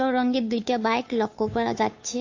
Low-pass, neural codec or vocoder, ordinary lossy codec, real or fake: 7.2 kHz; codec, 16 kHz, 2 kbps, FunCodec, trained on Chinese and English, 25 frames a second; AAC, 48 kbps; fake